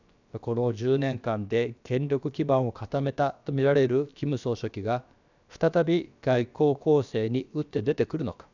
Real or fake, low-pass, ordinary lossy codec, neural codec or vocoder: fake; 7.2 kHz; none; codec, 16 kHz, about 1 kbps, DyCAST, with the encoder's durations